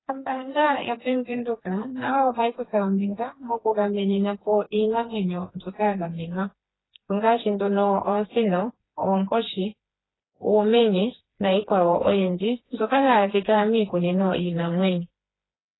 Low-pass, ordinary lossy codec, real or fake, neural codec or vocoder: 7.2 kHz; AAC, 16 kbps; fake; codec, 16 kHz, 2 kbps, FreqCodec, smaller model